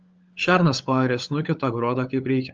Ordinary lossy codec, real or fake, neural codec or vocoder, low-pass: Opus, 24 kbps; fake; codec, 16 kHz, 16 kbps, FunCodec, trained on LibriTTS, 50 frames a second; 7.2 kHz